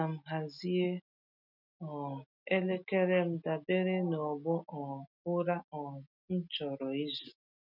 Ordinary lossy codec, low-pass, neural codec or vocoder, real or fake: none; 5.4 kHz; none; real